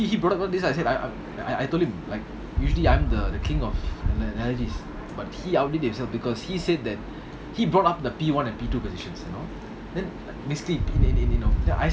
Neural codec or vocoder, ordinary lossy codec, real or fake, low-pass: none; none; real; none